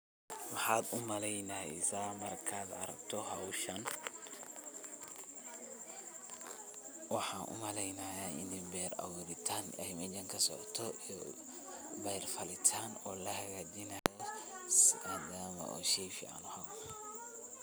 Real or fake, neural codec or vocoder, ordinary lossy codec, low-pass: real; none; none; none